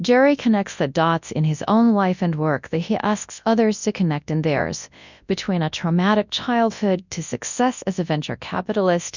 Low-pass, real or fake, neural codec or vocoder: 7.2 kHz; fake; codec, 24 kHz, 0.9 kbps, WavTokenizer, large speech release